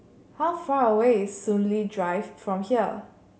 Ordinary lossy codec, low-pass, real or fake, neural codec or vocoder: none; none; real; none